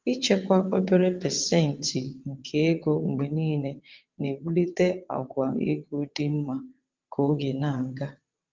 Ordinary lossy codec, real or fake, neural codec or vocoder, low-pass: Opus, 32 kbps; fake; vocoder, 22.05 kHz, 80 mel bands, WaveNeXt; 7.2 kHz